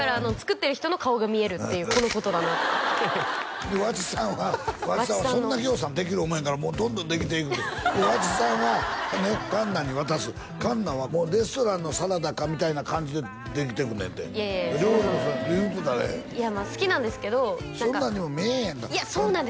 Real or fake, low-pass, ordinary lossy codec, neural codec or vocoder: real; none; none; none